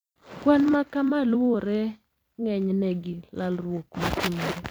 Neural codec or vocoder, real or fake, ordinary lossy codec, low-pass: vocoder, 44.1 kHz, 128 mel bands every 256 samples, BigVGAN v2; fake; none; none